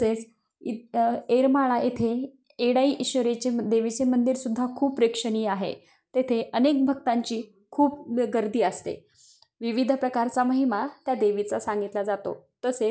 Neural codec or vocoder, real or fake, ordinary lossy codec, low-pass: none; real; none; none